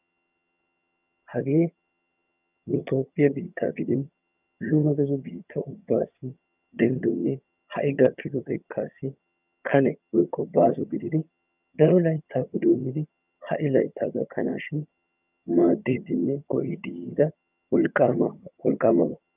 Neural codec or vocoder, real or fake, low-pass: vocoder, 22.05 kHz, 80 mel bands, HiFi-GAN; fake; 3.6 kHz